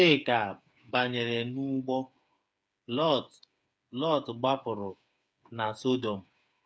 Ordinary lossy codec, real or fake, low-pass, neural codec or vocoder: none; fake; none; codec, 16 kHz, 8 kbps, FreqCodec, smaller model